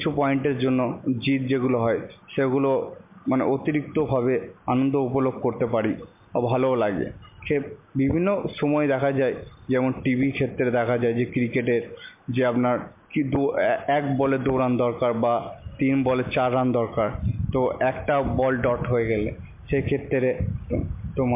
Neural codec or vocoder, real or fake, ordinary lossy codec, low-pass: none; real; MP3, 32 kbps; 3.6 kHz